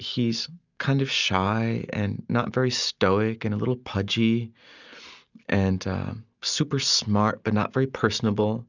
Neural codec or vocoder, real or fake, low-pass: none; real; 7.2 kHz